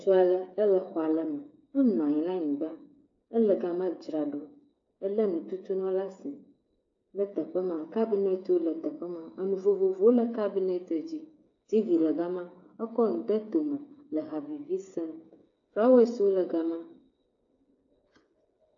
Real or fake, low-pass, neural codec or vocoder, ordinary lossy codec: fake; 7.2 kHz; codec, 16 kHz, 8 kbps, FreqCodec, smaller model; AAC, 48 kbps